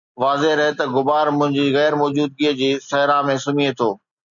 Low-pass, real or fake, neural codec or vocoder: 7.2 kHz; real; none